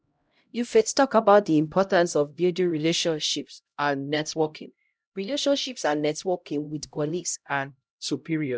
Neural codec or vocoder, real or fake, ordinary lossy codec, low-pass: codec, 16 kHz, 0.5 kbps, X-Codec, HuBERT features, trained on LibriSpeech; fake; none; none